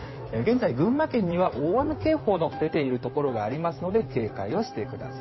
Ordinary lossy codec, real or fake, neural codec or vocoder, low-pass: MP3, 24 kbps; fake; codec, 16 kHz in and 24 kHz out, 2.2 kbps, FireRedTTS-2 codec; 7.2 kHz